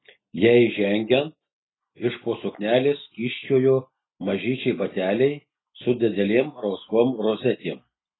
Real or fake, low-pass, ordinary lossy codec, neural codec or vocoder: real; 7.2 kHz; AAC, 16 kbps; none